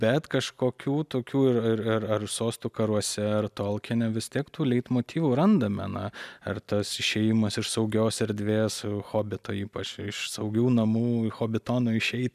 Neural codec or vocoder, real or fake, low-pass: none; real; 14.4 kHz